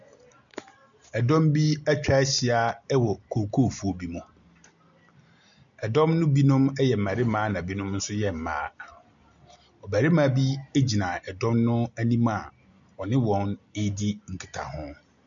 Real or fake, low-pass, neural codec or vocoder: real; 7.2 kHz; none